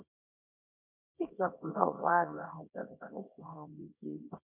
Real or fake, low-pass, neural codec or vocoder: fake; 3.6 kHz; codec, 24 kHz, 0.9 kbps, WavTokenizer, small release